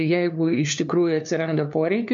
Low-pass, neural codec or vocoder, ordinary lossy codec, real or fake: 7.2 kHz; codec, 16 kHz, 2 kbps, FunCodec, trained on LibriTTS, 25 frames a second; MP3, 64 kbps; fake